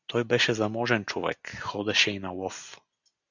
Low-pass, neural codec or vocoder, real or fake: 7.2 kHz; none; real